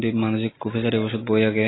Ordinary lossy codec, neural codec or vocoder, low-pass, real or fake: AAC, 16 kbps; none; 7.2 kHz; real